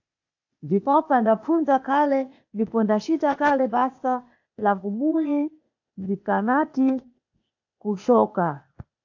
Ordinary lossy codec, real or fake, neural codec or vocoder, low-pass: AAC, 48 kbps; fake; codec, 16 kHz, 0.8 kbps, ZipCodec; 7.2 kHz